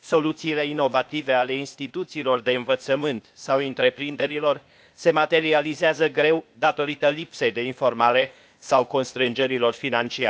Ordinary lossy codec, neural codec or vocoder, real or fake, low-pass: none; codec, 16 kHz, 0.8 kbps, ZipCodec; fake; none